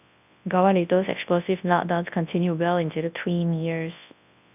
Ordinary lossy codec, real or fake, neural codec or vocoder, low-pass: none; fake; codec, 24 kHz, 0.9 kbps, WavTokenizer, large speech release; 3.6 kHz